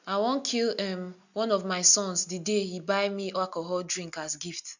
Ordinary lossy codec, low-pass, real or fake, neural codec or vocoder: none; 7.2 kHz; real; none